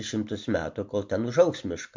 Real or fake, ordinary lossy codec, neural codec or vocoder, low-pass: real; MP3, 48 kbps; none; 7.2 kHz